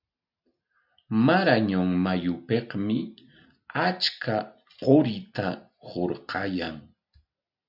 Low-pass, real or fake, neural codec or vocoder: 5.4 kHz; real; none